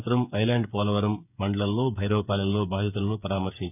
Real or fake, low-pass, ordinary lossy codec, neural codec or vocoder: fake; 3.6 kHz; none; codec, 16 kHz, 8 kbps, FreqCodec, smaller model